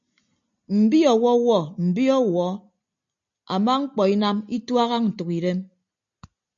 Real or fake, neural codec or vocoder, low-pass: real; none; 7.2 kHz